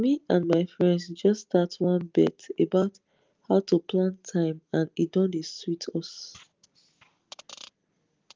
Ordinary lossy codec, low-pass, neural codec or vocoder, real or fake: Opus, 24 kbps; 7.2 kHz; none; real